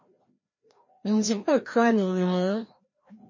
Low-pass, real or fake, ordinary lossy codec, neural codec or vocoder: 7.2 kHz; fake; MP3, 32 kbps; codec, 16 kHz, 1 kbps, FreqCodec, larger model